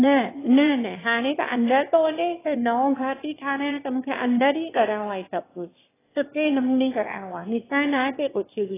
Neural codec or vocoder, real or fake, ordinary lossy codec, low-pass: autoencoder, 22.05 kHz, a latent of 192 numbers a frame, VITS, trained on one speaker; fake; AAC, 16 kbps; 3.6 kHz